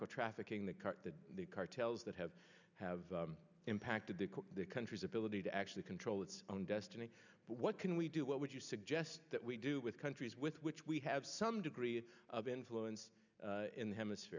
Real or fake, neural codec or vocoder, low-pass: real; none; 7.2 kHz